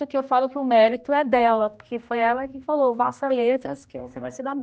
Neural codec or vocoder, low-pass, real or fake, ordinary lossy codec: codec, 16 kHz, 1 kbps, X-Codec, HuBERT features, trained on general audio; none; fake; none